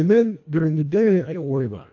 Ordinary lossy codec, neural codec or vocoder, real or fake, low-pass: AAC, 48 kbps; codec, 24 kHz, 1.5 kbps, HILCodec; fake; 7.2 kHz